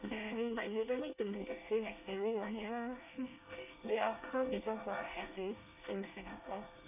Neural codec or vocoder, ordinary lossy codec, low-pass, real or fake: codec, 24 kHz, 1 kbps, SNAC; none; 3.6 kHz; fake